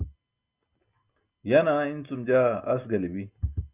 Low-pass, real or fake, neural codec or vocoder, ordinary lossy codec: 3.6 kHz; real; none; Opus, 64 kbps